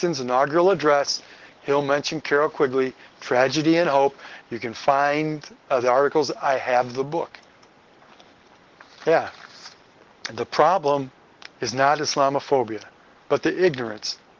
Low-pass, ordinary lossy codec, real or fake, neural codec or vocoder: 7.2 kHz; Opus, 16 kbps; real; none